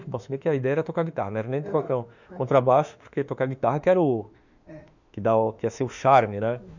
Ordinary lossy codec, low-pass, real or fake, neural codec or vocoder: none; 7.2 kHz; fake; autoencoder, 48 kHz, 32 numbers a frame, DAC-VAE, trained on Japanese speech